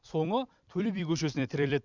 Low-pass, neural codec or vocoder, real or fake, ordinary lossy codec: 7.2 kHz; vocoder, 44.1 kHz, 128 mel bands every 256 samples, BigVGAN v2; fake; none